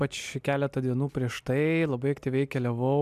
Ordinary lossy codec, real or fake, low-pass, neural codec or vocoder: MP3, 96 kbps; real; 14.4 kHz; none